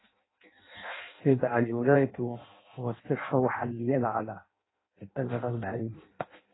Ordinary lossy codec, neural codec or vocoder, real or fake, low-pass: AAC, 16 kbps; codec, 16 kHz in and 24 kHz out, 0.6 kbps, FireRedTTS-2 codec; fake; 7.2 kHz